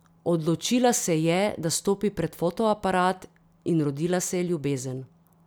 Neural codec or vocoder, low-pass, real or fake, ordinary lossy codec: none; none; real; none